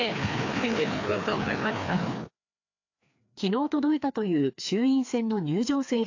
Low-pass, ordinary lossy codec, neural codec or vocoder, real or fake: 7.2 kHz; AAC, 48 kbps; codec, 16 kHz, 2 kbps, FreqCodec, larger model; fake